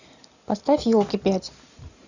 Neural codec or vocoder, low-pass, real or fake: none; 7.2 kHz; real